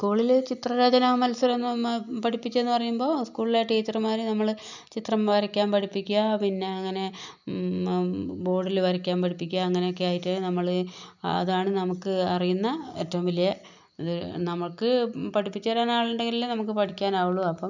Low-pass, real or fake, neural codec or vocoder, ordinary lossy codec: 7.2 kHz; real; none; none